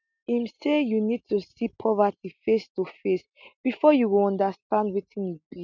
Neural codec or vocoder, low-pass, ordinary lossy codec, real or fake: none; 7.2 kHz; none; real